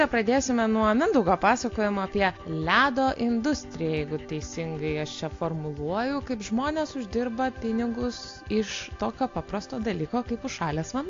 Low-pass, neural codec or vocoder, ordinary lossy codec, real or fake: 7.2 kHz; none; AAC, 48 kbps; real